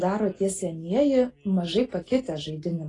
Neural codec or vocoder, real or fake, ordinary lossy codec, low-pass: none; real; AAC, 32 kbps; 10.8 kHz